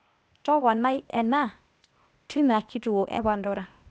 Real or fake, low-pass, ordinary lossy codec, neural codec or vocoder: fake; none; none; codec, 16 kHz, 0.8 kbps, ZipCodec